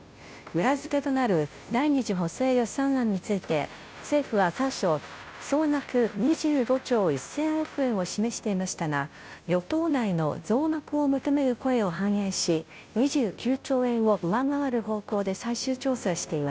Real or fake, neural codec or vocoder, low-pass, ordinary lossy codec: fake; codec, 16 kHz, 0.5 kbps, FunCodec, trained on Chinese and English, 25 frames a second; none; none